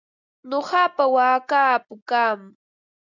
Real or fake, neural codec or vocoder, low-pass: real; none; 7.2 kHz